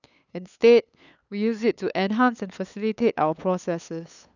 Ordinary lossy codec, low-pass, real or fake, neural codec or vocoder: none; 7.2 kHz; fake; codec, 16 kHz, 8 kbps, FunCodec, trained on LibriTTS, 25 frames a second